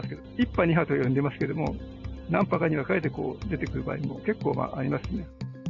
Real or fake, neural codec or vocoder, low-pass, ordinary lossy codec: real; none; 7.2 kHz; none